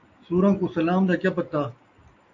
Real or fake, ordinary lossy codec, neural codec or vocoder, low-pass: real; AAC, 48 kbps; none; 7.2 kHz